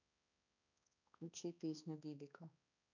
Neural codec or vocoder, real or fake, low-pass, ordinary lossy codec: codec, 16 kHz, 2 kbps, X-Codec, HuBERT features, trained on balanced general audio; fake; 7.2 kHz; none